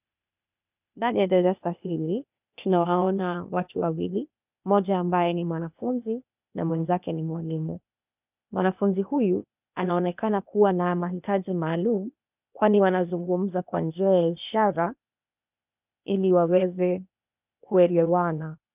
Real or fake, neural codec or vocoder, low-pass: fake; codec, 16 kHz, 0.8 kbps, ZipCodec; 3.6 kHz